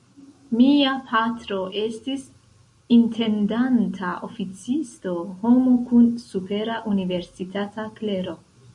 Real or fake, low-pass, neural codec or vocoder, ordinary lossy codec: real; 10.8 kHz; none; MP3, 48 kbps